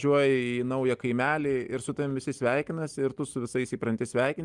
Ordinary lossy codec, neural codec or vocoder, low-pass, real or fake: Opus, 32 kbps; none; 10.8 kHz; real